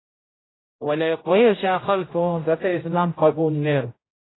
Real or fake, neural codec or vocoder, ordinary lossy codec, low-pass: fake; codec, 16 kHz, 0.5 kbps, X-Codec, HuBERT features, trained on general audio; AAC, 16 kbps; 7.2 kHz